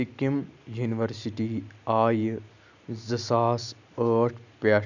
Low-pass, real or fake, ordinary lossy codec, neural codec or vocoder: 7.2 kHz; real; none; none